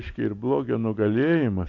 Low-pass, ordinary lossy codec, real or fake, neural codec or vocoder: 7.2 kHz; AAC, 48 kbps; real; none